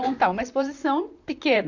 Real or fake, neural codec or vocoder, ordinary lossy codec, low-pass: fake; vocoder, 44.1 kHz, 128 mel bands, Pupu-Vocoder; none; 7.2 kHz